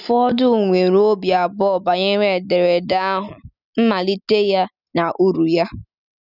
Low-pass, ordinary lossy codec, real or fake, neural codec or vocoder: 5.4 kHz; none; real; none